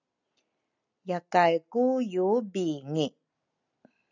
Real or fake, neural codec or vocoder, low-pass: real; none; 7.2 kHz